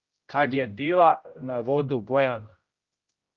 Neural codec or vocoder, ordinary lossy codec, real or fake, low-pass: codec, 16 kHz, 0.5 kbps, X-Codec, HuBERT features, trained on general audio; Opus, 32 kbps; fake; 7.2 kHz